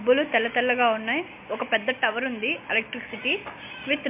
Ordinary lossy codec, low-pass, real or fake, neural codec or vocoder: MP3, 32 kbps; 3.6 kHz; real; none